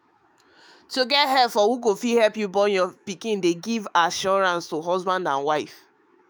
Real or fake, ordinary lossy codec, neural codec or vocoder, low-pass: fake; none; autoencoder, 48 kHz, 128 numbers a frame, DAC-VAE, trained on Japanese speech; none